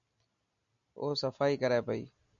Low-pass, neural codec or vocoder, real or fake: 7.2 kHz; none; real